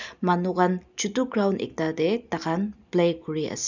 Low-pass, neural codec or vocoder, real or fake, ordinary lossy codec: 7.2 kHz; none; real; none